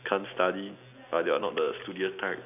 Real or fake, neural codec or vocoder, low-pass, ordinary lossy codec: real; none; 3.6 kHz; none